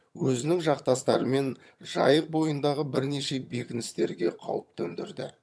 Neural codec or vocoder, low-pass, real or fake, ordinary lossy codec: vocoder, 22.05 kHz, 80 mel bands, HiFi-GAN; none; fake; none